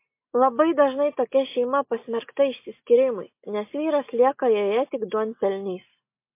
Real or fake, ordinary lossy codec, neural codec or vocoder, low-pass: real; MP3, 24 kbps; none; 3.6 kHz